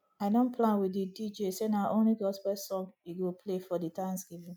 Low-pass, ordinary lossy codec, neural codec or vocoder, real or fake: none; none; none; real